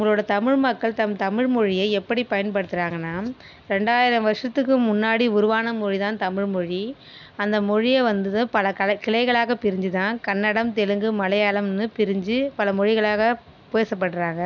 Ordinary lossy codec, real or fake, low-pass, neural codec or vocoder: none; real; 7.2 kHz; none